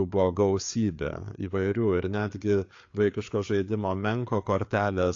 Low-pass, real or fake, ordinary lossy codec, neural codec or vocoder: 7.2 kHz; fake; AAC, 48 kbps; codec, 16 kHz, 4 kbps, FreqCodec, larger model